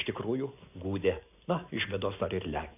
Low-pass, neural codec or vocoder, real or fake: 3.6 kHz; none; real